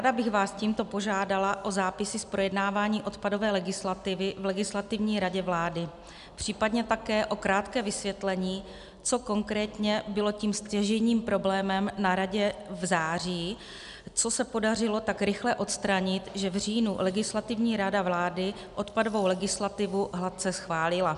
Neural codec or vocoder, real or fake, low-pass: none; real; 10.8 kHz